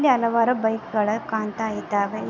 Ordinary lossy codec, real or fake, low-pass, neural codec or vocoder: none; real; 7.2 kHz; none